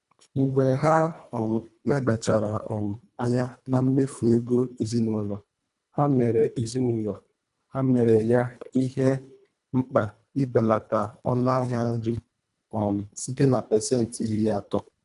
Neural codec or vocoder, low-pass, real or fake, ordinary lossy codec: codec, 24 kHz, 1.5 kbps, HILCodec; 10.8 kHz; fake; none